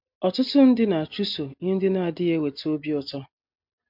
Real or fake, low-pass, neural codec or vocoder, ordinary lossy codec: real; 5.4 kHz; none; MP3, 48 kbps